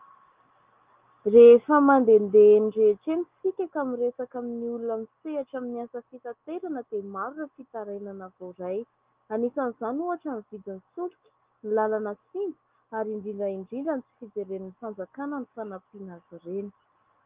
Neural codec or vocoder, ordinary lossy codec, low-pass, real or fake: none; Opus, 32 kbps; 3.6 kHz; real